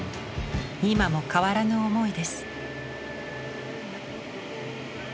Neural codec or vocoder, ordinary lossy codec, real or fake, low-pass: none; none; real; none